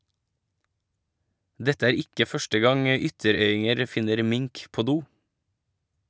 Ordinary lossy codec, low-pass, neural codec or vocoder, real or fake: none; none; none; real